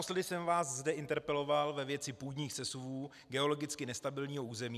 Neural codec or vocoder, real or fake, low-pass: none; real; 14.4 kHz